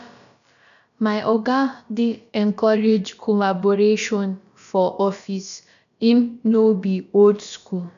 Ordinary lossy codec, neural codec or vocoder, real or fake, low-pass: none; codec, 16 kHz, about 1 kbps, DyCAST, with the encoder's durations; fake; 7.2 kHz